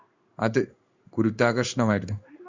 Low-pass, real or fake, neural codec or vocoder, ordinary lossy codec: 7.2 kHz; fake; codec, 16 kHz in and 24 kHz out, 1 kbps, XY-Tokenizer; Opus, 64 kbps